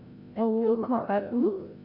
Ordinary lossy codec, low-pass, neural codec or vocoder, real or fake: AAC, 32 kbps; 5.4 kHz; codec, 16 kHz, 0.5 kbps, FreqCodec, larger model; fake